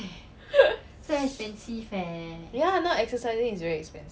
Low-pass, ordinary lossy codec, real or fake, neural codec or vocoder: none; none; real; none